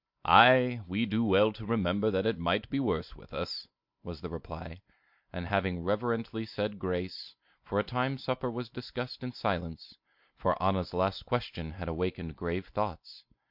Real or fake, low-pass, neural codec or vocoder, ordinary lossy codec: real; 5.4 kHz; none; MP3, 48 kbps